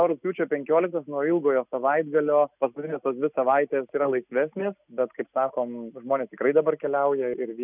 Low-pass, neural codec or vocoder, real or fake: 3.6 kHz; none; real